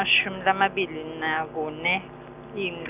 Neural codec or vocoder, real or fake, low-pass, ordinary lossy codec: none; real; 3.6 kHz; none